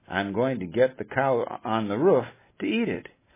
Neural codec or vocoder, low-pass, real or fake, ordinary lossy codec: none; 3.6 kHz; real; MP3, 16 kbps